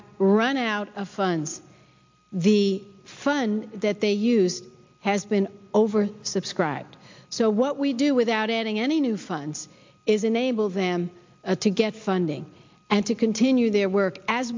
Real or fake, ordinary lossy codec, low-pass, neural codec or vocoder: real; MP3, 64 kbps; 7.2 kHz; none